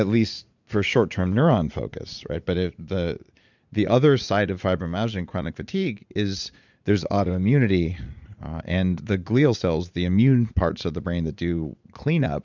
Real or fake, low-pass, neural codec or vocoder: fake; 7.2 kHz; vocoder, 44.1 kHz, 80 mel bands, Vocos